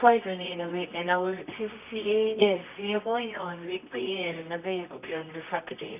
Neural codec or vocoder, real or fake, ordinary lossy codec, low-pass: codec, 24 kHz, 0.9 kbps, WavTokenizer, medium music audio release; fake; none; 3.6 kHz